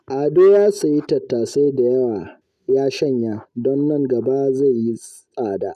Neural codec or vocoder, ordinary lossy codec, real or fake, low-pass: none; none; real; 14.4 kHz